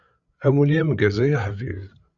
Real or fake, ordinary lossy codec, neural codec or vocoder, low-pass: fake; Opus, 64 kbps; codec, 16 kHz, 8 kbps, FreqCodec, larger model; 7.2 kHz